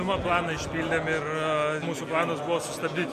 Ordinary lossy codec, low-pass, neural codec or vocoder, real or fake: MP3, 64 kbps; 14.4 kHz; none; real